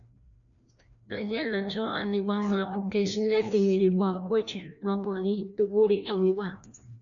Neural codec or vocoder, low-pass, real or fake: codec, 16 kHz, 1 kbps, FreqCodec, larger model; 7.2 kHz; fake